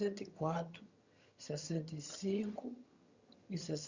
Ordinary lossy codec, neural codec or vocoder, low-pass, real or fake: Opus, 64 kbps; vocoder, 22.05 kHz, 80 mel bands, HiFi-GAN; 7.2 kHz; fake